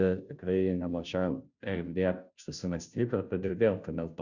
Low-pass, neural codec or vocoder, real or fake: 7.2 kHz; codec, 16 kHz, 0.5 kbps, FunCodec, trained on Chinese and English, 25 frames a second; fake